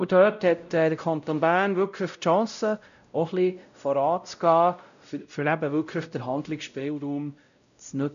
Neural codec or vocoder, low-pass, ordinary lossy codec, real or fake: codec, 16 kHz, 0.5 kbps, X-Codec, WavLM features, trained on Multilingual LibriSpeech; 7.2 kHz; none; fake